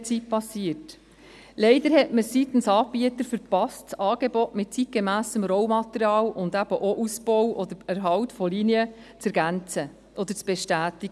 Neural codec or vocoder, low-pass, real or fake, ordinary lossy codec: none; none; real; none